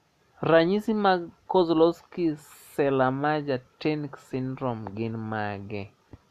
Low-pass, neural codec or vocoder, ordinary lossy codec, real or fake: 14.4 kHz; none; none; real